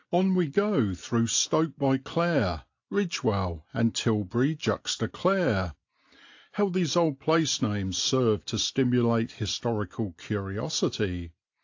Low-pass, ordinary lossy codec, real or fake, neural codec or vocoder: 7.2 kHz; AAC, 48 kbps; real; none